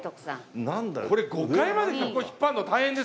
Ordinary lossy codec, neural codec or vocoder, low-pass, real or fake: none; none; none; real